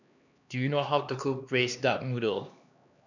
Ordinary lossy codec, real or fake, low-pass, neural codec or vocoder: none; fake; 7.2 kHz; codec, 16 kHz, 2 kbps, X-Codec, HuBERT features, trained on LibriSpeech